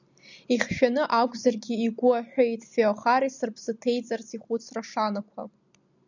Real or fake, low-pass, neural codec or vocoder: real; 7.2 kHz; none